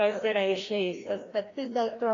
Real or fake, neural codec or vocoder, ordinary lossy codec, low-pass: fake; codec, 16 kHz, 1 kbps, FreqCodec, larger model; AAC, 32 kbps; 7.2 kHz